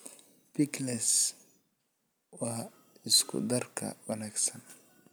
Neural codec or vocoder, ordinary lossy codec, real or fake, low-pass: none; none; real; none